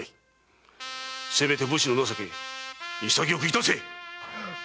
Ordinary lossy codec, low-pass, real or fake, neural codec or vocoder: none; none; real; none